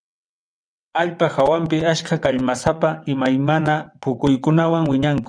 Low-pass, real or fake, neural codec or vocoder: 9.9 kHz; fake; vocoder, 22.05 kHz, 80 mel bands, WaveNeXt